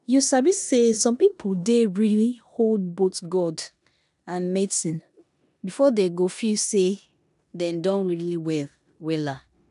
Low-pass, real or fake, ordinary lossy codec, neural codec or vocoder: 10.8 kHz; fake; none; codec, 16 kHz in and 24 kHz out, 0.9 kbps, LongCat-Audio-Codec, four codebook decoder